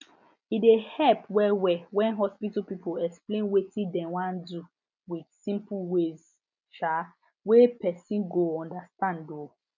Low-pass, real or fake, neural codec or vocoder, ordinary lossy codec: 7.2 kHz; real; none; none